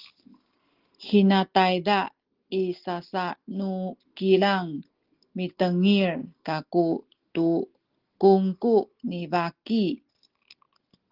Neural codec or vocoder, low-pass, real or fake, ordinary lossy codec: none; 5.4 kHz; real; Opus, 16 kbps